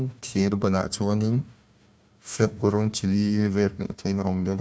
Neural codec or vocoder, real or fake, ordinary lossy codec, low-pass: codec, 16 kHz, 1 kbps, FunCodec, trained on Chinese and English, 50 frames a second; fake; none; none